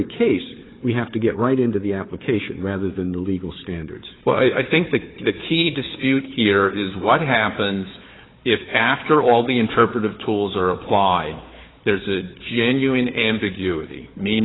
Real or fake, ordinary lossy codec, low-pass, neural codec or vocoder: fake; AAC, 16 kbps; 7.2 kHz; codec, 16 kHz, 4 kbps, FunCodec, trained on Chinese and English, 50 frames a second